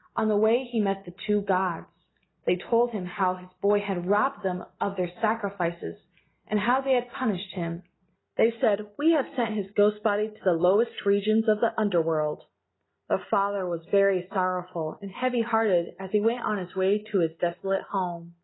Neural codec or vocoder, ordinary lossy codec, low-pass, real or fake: none; AAC, 16 kbps; 7.2 kHz; real